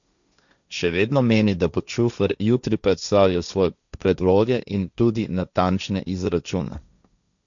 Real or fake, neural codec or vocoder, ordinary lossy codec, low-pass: fake; codec, 16 kHz, 1.1 kbps, Voila-Tokenizer; none; 7.2 kHz